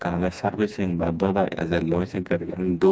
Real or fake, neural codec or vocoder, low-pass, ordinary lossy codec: fake; codec, 16 kHz, 2 kbps, FreqCodec, smaller model; none; none